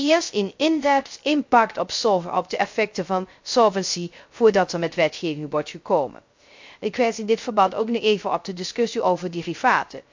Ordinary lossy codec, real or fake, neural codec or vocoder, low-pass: MP3, 48 kbps; fake; codec, 16 kHz, 0.3 kbps, FocalCodec; 7.2 kHz